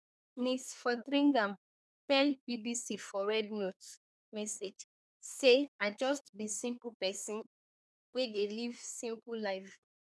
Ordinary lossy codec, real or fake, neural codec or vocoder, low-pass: none; fake; codec, 24 kHz, 1 kbps, SNAC; none